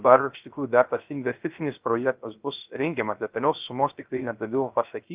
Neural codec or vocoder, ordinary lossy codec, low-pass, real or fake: codec, 16 kHz, 0.3 kbps, FocalCodec; Opus, 24 kbps; 3.6 kHz; fake